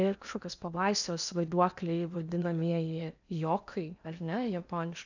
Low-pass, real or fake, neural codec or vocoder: 7.2 kHz; fake; codec, 16 kHz in and 24 kHz out, 0.8 kbps, FocalCodec, streaming, 65536 codes